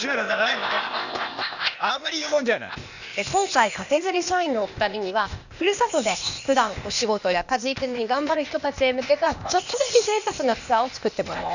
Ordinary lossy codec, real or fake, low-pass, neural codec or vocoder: none; fake; 7.2 kHz; codec, 16 kHz, 0.8 kbps, ZipCodec